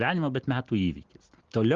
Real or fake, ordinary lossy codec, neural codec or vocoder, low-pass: real; Opus, 24 kbps; none; 7.2 kHz